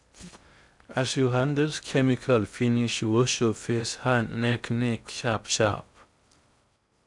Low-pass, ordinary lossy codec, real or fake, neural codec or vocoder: 10.8 kHz; none; fake; codec, 16 kHz in and 24 kHz out, 0.6 kbps, FocalCodec, streaming, 2048 codes